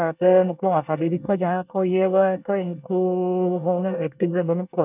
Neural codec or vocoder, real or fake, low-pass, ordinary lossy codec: codec, 24 kHz, 1 kbps, SNAC; fake; 3.6 kHz; none